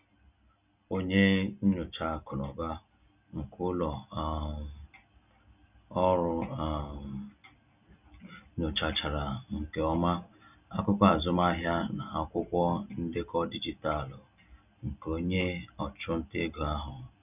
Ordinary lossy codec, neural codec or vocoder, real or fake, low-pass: none; none; real; 3.6 kHz